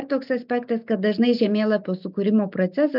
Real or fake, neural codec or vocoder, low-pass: real; none; 5.4 kHz